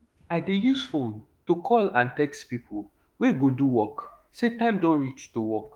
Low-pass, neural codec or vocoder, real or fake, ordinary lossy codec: 19.8 kHz; autoencoder, 48 kHz, 32 numbers a frame, DAC-VAE, trained on Japanese speech; fake; Opus, 32 kbps